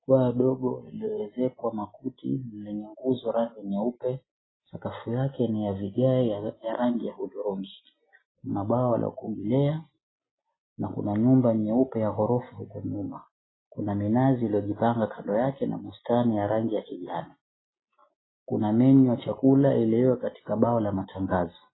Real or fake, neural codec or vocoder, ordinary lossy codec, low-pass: real; none; AAC, 16 kbps; 7.2 kHz